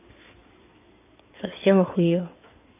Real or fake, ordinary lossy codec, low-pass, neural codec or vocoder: fake; AAC, 32 kbps; 3.6 kHz; codec, 16 kHz in and 24 kHz out, 2.2 kbps, FireRedTTS-2 codec